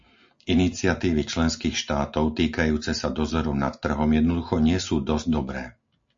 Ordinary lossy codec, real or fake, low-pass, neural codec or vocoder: MP3, 48 kbps; real; 7.2 kHz; none